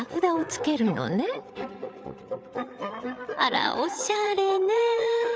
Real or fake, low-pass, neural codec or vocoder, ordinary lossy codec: fake; none; codec, 16 kHz, 8 kbps, FreqCodec, larger model; none